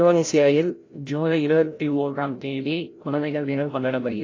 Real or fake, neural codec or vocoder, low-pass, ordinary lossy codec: fake; codec, 16 kHz, 0.5 kbps, FreqCodec, larger model; 7.2 kHz; AAC, 32 kbps